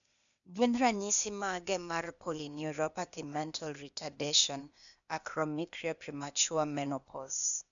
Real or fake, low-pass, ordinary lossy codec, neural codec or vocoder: fake; 7.2 kHz; none; codec, 16 kHz, 0.8 kbps, ZipCodec